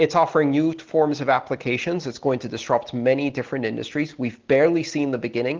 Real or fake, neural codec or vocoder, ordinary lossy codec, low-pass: real; none; Opus, 16 kbps; 7.2 kHz